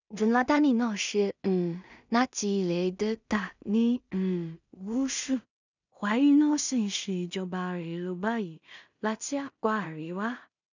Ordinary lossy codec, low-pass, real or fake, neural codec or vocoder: none; 7.2 kHz; fake; codec, 16 kHz in and 24 kHz out, 0.4 kbps, LongCat-Audio-Codec, two codebook decoder